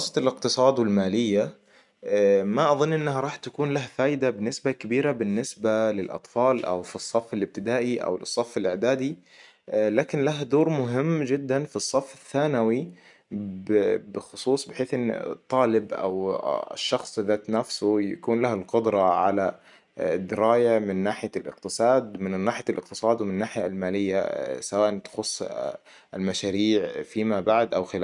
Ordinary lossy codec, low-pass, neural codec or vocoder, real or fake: none; 10.8 kHz; none; real